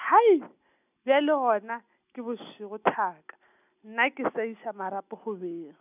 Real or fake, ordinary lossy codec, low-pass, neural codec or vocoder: real; none; 3.6 kHz; none